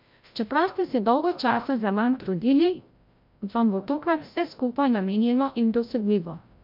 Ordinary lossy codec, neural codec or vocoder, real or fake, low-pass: MP3, 48 kbps; codec, 16 kHz, 0.5 kbps, FreqCodec, larger model; fake; 5.4 kHz